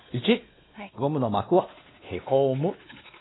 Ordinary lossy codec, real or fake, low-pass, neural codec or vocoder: AAC, 16 kbps; fake; 7.2 kHz; codec, 16 kHz, 2 kbps, X-Codec, WavLM features, trained on Multilingual LibriSpeech